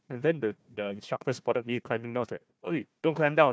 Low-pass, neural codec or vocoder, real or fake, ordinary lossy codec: none; codec, 16 kHz, 1 kbps, FunCodec, trained on Chinese and English, 50 frames a second; fake; none